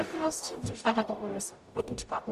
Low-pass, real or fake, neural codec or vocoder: 14.4 kHz; fake; codec, 44.1 kHz, 0.9 kbps, DAC